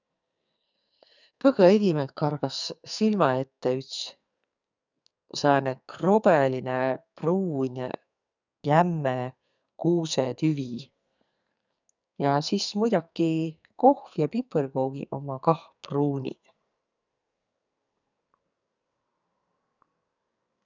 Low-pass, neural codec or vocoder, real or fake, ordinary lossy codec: 7.2 kHz; codec, 44.1 kHz, 2.6 kbps, SNAC; fake; none